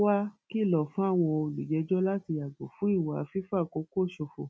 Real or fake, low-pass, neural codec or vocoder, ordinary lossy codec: real; none; none; none